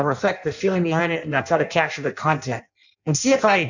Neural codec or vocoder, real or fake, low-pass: codec, 16 kHz in and 24 kHz out, 0.6 kbps, FireRedTTS-2 codec; fake; 7.2 kHz